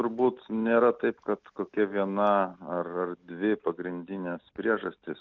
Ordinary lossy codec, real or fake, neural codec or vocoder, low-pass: Opus, 24 kbps; real; none; 7.2 kHz